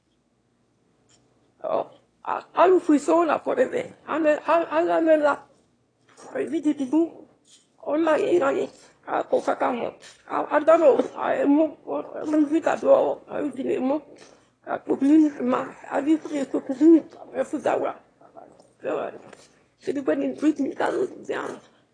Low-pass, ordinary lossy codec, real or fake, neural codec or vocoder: 9.9 kHz; AAC, 32 kbps; fake; autoencoder, 22.05 kHz, a latent of 192 numbers a frame, VITS, trained on one speaker